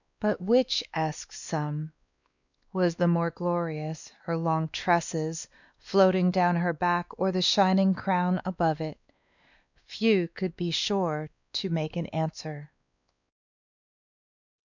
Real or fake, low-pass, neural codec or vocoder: fake; 7.2 kHz; codec, 16 kHz, 2 kbps, X-Codec, WavLM features, trained on Multilingual LibriSpeech